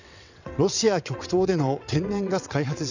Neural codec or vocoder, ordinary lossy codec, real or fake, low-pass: vocoder, 22.05 kHz, 80 mel bands, WaveNeXt; none; fake; 7.2 kHz